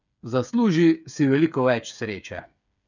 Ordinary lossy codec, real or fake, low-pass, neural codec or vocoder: none; fake; 7.2 kHz; codec, 16 kHz, 8 kbps, FreqCodec, smaller model